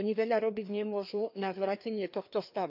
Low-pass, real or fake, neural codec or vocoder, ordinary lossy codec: 5.4 kHz; fake; codec, 16 kHz, 2 kbps, FreqCodec, larger model; AAC, 48 kbps